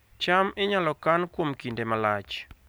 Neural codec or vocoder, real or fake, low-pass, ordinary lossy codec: none; real; none; none